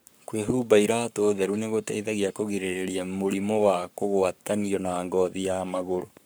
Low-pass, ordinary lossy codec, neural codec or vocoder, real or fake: none; none; codec, 44.1 kHz, 7.8 kbps, Pupu-Codec; fake